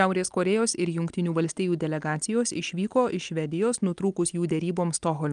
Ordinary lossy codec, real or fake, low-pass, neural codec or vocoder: Opus, 32 kbps; real; 9.9 kHz; none